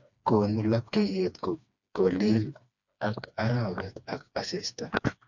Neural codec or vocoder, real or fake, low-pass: codec, 16 kHz, 2 kbps, FreqCodec, smaller model; fake; 7.2 kHz